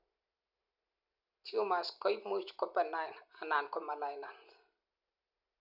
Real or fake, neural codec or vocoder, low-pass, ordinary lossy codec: real; none; 5.4 kHz; none